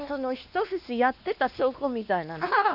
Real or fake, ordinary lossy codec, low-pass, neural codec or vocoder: fake; none; 5.4 kHz; codec, 16 kHz, 2 kbps, X-Codec, WavLM features, trained on Multilingual LibriSpeech